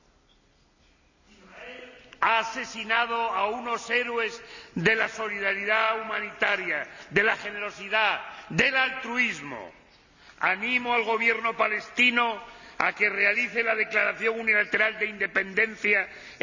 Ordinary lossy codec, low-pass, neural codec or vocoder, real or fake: none; 7.2 kHz; none; real